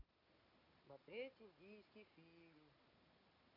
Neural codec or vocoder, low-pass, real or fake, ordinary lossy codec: none; 5.4 kHz; real; none